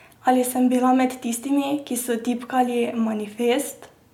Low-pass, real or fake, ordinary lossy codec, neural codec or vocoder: 19.8 kHz; real; none; none